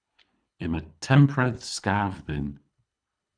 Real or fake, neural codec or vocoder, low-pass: fake; codec, 24 kHz, 3 kbps, HILCodec; 9.9 kHz